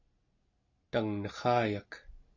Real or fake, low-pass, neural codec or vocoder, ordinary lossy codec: real; 7.2 kHz; none; AAC, 48 kbps